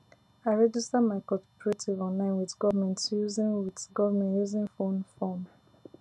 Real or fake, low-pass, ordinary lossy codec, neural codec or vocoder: real; none; none; none